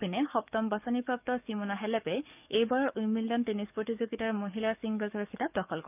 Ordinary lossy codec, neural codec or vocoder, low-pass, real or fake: none; codec, 44.1 kHz, 7.8 kbps, DAC; 3.6 kHz; fake